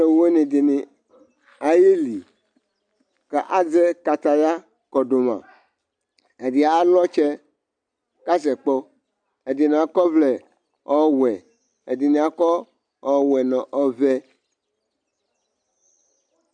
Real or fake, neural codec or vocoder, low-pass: real; none; 9.9 kHz